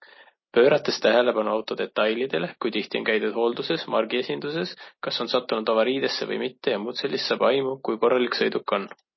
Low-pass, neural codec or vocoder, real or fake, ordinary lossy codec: 7.2 kHz; none; real; MP3, 24 kbps